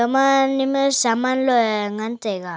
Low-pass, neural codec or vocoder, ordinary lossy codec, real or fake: none; none; none; real